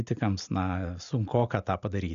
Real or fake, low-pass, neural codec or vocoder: real; 7.2 kHz; none